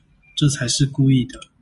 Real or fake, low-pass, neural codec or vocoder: real; 10.8 kHz; none